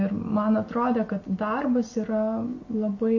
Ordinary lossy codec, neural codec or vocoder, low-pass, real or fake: MP3, 32 kbps; none; 7.2 kHz; real